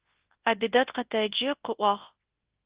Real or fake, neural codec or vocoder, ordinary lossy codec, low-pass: fake; codec, 24 kHz, 0.9 kbps, WavTokenizer, large speech release; Opus, 16 kbps; 3.6 kHz